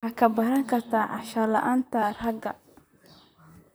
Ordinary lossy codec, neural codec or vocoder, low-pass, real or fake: none; vocoder, 44.1 kHz, 128 mel bands, Pupu-Vocoder; none; fake